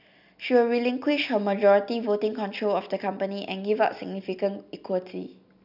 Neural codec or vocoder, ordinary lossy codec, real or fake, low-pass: none; none; real; 5.4 kHz